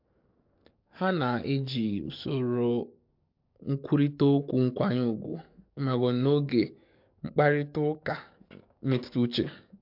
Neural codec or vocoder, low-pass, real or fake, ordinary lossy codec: codec, 44.1 kHz, 7.8 kbps, DAC; 5.4 kHz; fake; MP3, 48 kbps